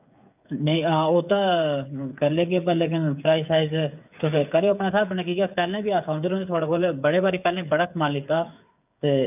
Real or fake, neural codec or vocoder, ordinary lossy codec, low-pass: fake; codec, 16 kHz, 8 kbps, FreqCodec, smaller model; none; 3.6 kHz